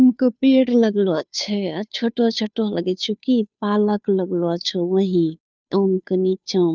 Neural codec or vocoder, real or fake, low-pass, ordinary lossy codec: codec, 16 kHz, 2 kbps, FunCodec, trained on Chinese and English, 25 frames a second; fake; none; none